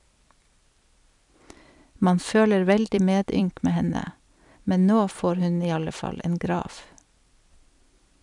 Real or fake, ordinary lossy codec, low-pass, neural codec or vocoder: fake; none; 10.8 kHz; vocoder, 44.1 kHz, 128 mel bands every 256 samples, BigVGAN v2